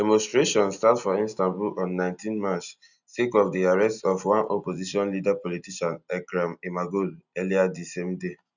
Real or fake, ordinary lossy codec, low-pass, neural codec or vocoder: real; none; 7.2 kHz; none